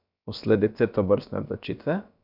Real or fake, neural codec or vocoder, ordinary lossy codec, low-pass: fake; codec, 16 kHz, about 1 kbps, DyCAST, with the encoder's durations; none; 5.4 kHz